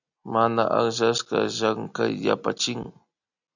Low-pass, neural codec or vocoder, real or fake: 7.2 kHz; none; real